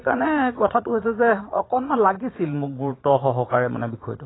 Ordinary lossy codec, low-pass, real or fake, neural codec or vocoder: AAC, 16 kbps; 7.2 kHz; fake; vocoder, 22.05 kHz, 80 mel bands, Vocos